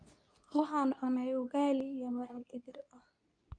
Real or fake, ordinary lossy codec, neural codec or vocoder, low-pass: fake; none; codec, 24 kHz, 0.9 kbps, WavTokenizer, medium speech release version 2; 9.9 kHz